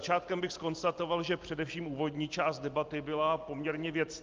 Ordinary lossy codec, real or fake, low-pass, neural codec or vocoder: Opus, 24 kbps; real; 7.2 kHz; none